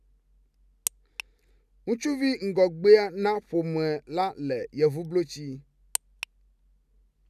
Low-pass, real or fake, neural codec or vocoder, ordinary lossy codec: 14.4 kHz; real; none; none